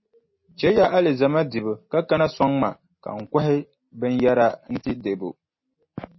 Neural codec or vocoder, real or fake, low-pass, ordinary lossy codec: none; real; 7.2 kHz; MP3, 24 kbps